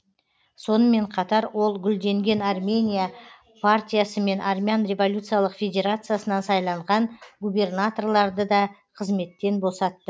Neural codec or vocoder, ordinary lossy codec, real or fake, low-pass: none; none; real; none